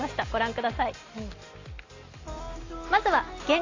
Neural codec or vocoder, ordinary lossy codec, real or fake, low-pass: none; AAC, 32 kbps; real; 7.2 kHz